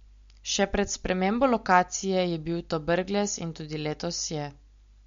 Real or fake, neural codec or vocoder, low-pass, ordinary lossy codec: real; none; 7.2 kHz; MP3, 48 kbps